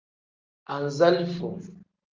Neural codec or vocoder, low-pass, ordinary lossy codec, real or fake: none; 7.2 kHz; Opus, 24 kbps; real